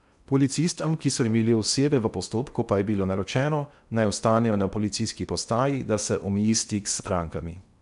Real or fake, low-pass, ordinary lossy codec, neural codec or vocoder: fake; 10.8 kHz; none; codec, 16 kHz in and 24 kHz out, 0.8 kbps, FocalCodec, streaming, 65536 codes